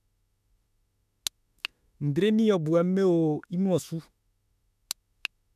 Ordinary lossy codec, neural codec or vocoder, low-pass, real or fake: none; autoencoder, 48 kHz, 32 numbers a frame, DAC-VAE, trained on Japanese speech; 14.4 kHz; fake